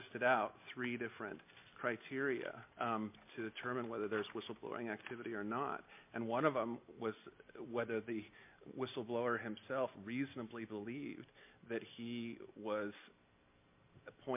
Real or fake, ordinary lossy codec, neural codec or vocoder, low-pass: real; MP3, 32 kbps; none; 3.6 kHz